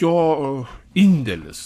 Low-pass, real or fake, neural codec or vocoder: 14.4 kHz; fake; codec, 44.1 kHz, 7.8 kbps, Pupu-Codec